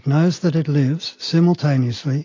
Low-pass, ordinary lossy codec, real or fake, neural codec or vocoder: 7.2 kHz; AAC, 32 kbps; real; none